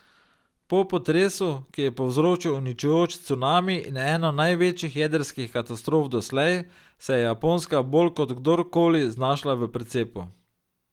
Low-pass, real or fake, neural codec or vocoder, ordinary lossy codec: 19.8 kHz; real; none; Opus, 24 kbps